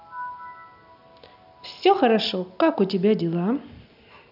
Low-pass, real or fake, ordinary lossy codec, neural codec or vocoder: 5.4 kHz; real; none; none